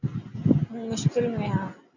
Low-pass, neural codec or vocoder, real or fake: 7.2 kHz; none; real